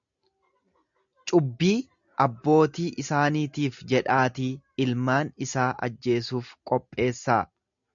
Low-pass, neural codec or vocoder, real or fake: 7.2 kHz; none; real